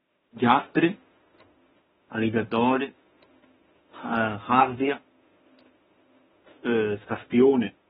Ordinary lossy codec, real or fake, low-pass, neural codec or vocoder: AAC, 16 kbps; fake; 19.8 kHz; autoencoder, 48 kHz, 32 numbers a frame, DAC-VAE, trained on Japanese speech